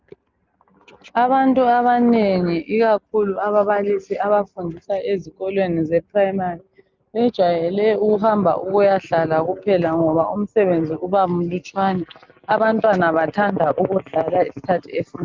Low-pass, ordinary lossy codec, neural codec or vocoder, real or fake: 7.2 kHz; Opus, 24 kbps; none; real